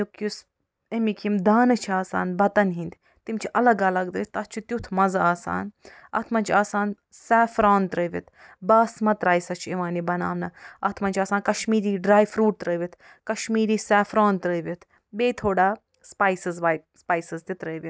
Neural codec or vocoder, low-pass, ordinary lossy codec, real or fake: none; none; none; real